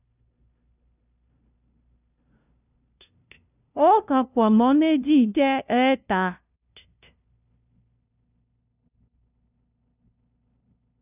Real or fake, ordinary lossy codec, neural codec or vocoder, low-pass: fake; none; codec, 16 kHz, 0.5 kbps, FunCodec, trained on LibriTTS, 25 frames a second; 3.6 kHz